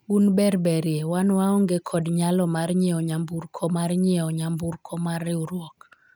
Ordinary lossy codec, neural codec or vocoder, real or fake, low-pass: none; none; real; none